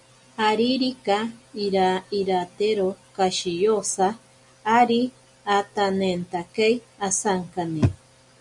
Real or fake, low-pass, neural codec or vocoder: real; 10.8 kHz; none